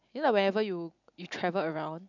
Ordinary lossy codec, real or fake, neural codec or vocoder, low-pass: none; real; none; 7.2 kHz